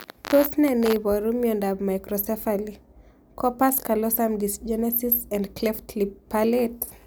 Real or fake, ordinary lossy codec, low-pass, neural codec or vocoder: real; none; none; none